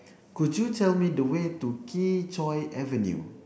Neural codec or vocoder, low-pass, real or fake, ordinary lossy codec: none; none; real; none